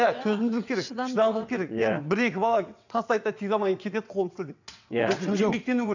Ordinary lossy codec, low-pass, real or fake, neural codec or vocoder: none; 7.2 kHz; fake; vocoder, 22.05 kHz, 80 mel bands, WaveNeXt